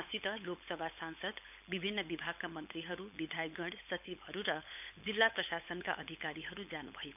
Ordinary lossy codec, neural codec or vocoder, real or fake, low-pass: none; codec, 16 kHz, 16 kbps, FunCodec, trained on LibriTTS, 50 frames a second; fake; 3.6 kHz